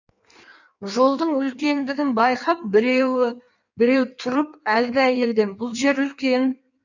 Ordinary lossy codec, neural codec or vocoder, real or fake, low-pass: none; codec, 16 kHz in and 24 kHz out, 1.1 kbps, FireRedTTS-2 codec; fake; 7.2 kHz